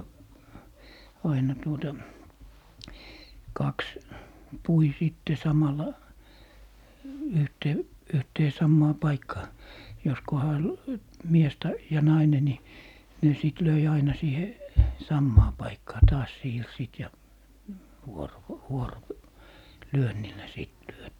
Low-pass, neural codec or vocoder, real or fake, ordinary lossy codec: 19.8 kHz; none; real; none